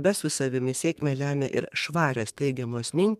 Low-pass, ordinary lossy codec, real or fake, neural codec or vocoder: 14.4 kHz; MP3, 96 kbps; fake; codec, 32 kHz, 1.9 kbps, SNAC